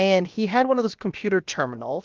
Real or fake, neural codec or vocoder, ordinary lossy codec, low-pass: fake; codec, 16 kHz, about 1 kbps, DyCAST, with the encoder's durations; Opus, 32 kbps; 7.2 kHz